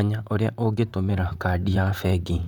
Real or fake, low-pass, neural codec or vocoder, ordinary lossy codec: fake; 19.8 kHz; vocoder, 44.1 kHz, 128 mel bands, Pupu-Vocoder; none